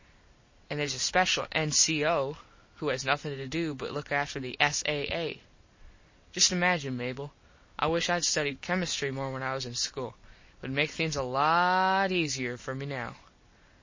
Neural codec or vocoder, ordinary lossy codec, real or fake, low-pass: none; MP3, 32 kbps; real; 7.2 kHz